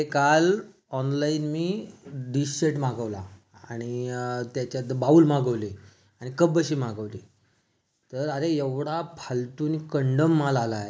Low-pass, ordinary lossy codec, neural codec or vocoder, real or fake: none; none; none; real